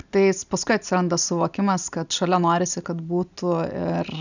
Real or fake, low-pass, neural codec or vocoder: real; 7.2 kHz; none